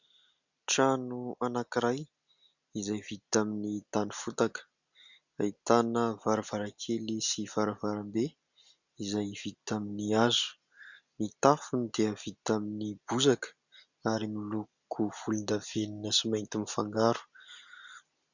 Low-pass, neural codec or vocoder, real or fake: 7.2 kHz; none; real